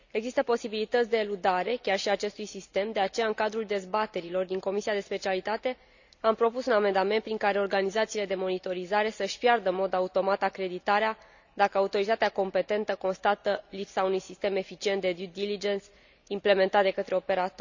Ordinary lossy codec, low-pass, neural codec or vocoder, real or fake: none; 7.2 kHz; none; real